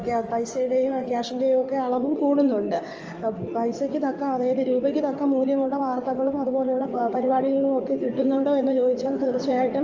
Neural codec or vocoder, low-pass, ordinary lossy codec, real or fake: codec, 16 kHz in and 24 kHz out, 2.2 kbps, FireRedTTS-2 codec; 7.2 kHz; Opus, 24 kbps; fake